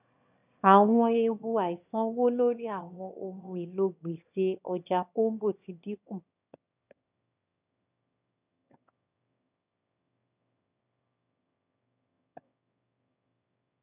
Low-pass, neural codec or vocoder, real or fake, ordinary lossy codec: 3.6 kHz; autoencoder, 22.05 kHz, a latent of 192 numbers a frame, VITS, trained on one speaker; fake; none